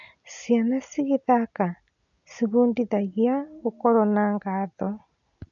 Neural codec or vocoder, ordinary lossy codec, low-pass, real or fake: none; none; 7.2 kHz; real